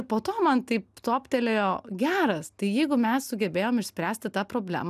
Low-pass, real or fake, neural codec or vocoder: 14.4 kHz; real; none